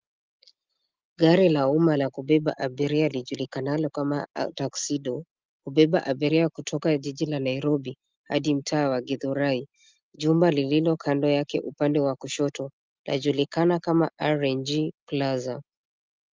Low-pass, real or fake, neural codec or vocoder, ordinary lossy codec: 7.2 kHz; real; none; Opus, 24 kbps